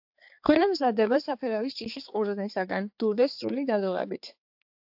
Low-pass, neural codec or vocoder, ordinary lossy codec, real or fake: 5.4 kHz; codec, 16 kHz, 4 kbps, X-Codec, HuBERT features, trained on balanced general audio; AAC, 48 kbps; fake